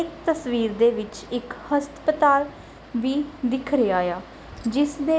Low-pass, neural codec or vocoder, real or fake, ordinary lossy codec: none; none; real; none